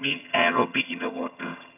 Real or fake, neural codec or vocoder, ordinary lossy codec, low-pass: fake; vocoder, 22.05 kHz, 80 mel bands, HiFi-GAN; none; 3.6 kHz